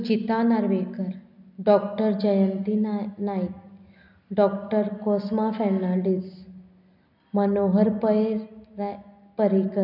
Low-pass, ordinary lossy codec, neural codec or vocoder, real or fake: 5.4 kHz; none; none; real